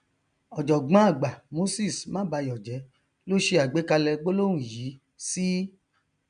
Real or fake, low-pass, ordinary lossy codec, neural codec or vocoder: real; 9.9 kHz; none; none